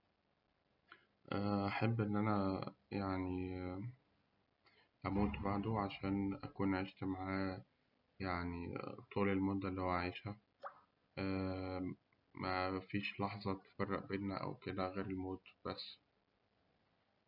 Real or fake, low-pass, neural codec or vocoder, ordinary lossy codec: real; 5.4 kHz; none; none